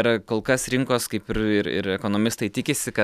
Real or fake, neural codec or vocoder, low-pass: real; none; 14.4 kHz